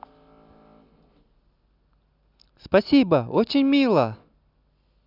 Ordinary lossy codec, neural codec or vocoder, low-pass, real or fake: none; none; 5.4 kHz; real